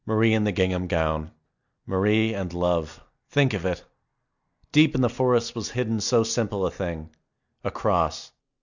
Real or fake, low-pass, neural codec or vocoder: real; 7.2 kHz; none